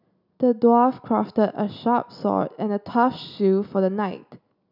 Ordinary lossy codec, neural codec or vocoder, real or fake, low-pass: none; none; real; 5.4 kHz